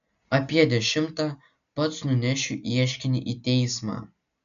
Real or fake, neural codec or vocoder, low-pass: real; none; 7.2 kHz